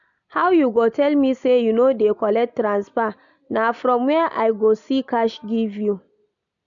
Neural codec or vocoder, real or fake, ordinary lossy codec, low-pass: none; real; none; 7.2 kHz